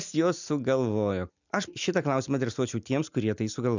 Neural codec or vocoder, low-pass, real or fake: none; 7.2 kHz; real